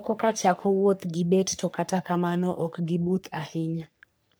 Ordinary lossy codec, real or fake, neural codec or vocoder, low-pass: none; fake; codec, 44.1 kHz, 3.4 kbps, Pupu-Codec; none